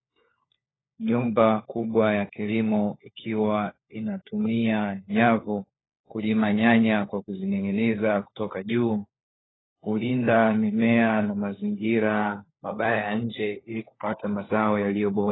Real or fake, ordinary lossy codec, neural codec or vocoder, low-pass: fake; AAC, 16 kbps; codec, 16 kHz, 4 kbps, FunCodec, trained on LibriTTS, 50 frames a second; 7.2 kHz